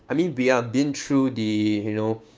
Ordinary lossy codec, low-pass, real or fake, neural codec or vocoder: none; none; fake; codec, 16 kHz, 6 kbps, DAC